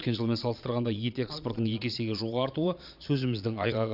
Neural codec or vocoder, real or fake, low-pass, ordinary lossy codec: vocoder, 22.05 kHz, 80 mel bands, Vocos; fake; 5.4 kHz; none